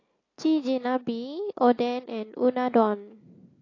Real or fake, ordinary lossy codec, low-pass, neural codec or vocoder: real; AAC, 32 kbps; 7.2 kHz; none